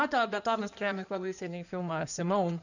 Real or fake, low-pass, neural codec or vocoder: fake; 7.2 kHz; codec, 16 kHz in and 24 kHz out, 2.2 kbps, FireRedTTS-2 codec